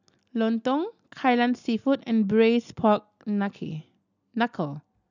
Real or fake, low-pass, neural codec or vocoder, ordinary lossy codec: real; 7.2 kHz; none; none